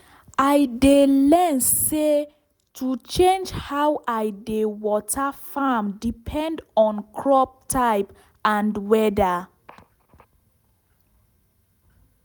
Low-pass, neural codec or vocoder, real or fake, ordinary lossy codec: none; none; real; none